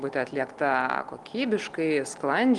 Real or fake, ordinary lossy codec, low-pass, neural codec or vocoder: real; Opus, 24 kbps; 10.8 kHz; none